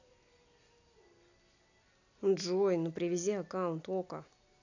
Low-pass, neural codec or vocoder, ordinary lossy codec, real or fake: 7.2 kHz; none; none; real